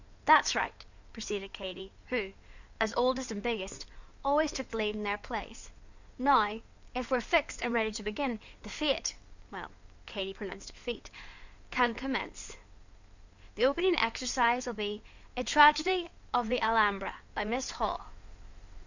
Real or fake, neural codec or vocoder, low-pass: fake; codec, 16 kHz in and 24 kHz out, 2.2 kbps, FireRedTTS-2 codec; 7.2 kHz